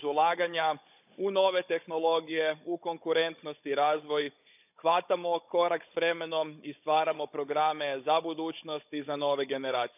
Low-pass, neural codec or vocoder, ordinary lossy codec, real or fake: 3.6 kHz; codec, 16 kHz, 16 kbps, FunCodec, trained on Chinese and English, 50 frames a second; none; fake